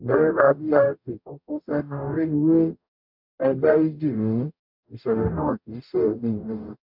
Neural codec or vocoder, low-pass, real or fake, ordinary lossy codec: codec, 44.1 kHz, 0.9 kbps, DAC; 5.4 kHz; fake; MP3, 48 kbps